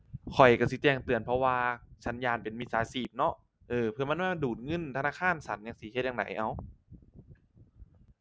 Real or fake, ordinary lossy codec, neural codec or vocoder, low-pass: real; none; none; none